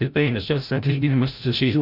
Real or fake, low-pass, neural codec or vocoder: fake; 5.4 kHz; codec, 16 kHz, 0.5 kbps, FreqCodec, larger model